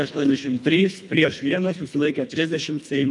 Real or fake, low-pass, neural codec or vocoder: fake; 10.8 kHz; codec, 24 kHz, 1.5 kbps, HILCodec